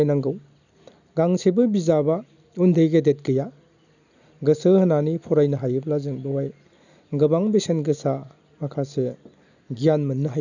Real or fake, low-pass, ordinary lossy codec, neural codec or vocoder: real; 7.2 kHz; none; none